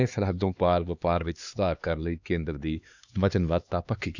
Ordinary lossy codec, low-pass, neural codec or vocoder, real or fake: none; 7.2 kHz; codec, 16 kHz, 2 kbps, X-Codec, HuBERT features, trained on LibriSpeech; fake